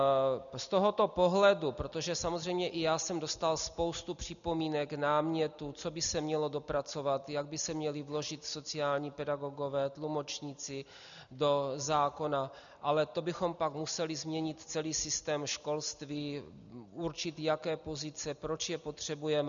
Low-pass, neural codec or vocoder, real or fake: 7.2 kHz; none; real